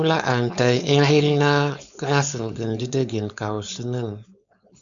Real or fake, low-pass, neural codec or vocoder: fake; 7.2 kHz; codec, 16 kHz, 4.8 kbps, FACodec